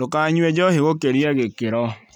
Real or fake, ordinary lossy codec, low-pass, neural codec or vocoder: real; none; 19.8 kHz; none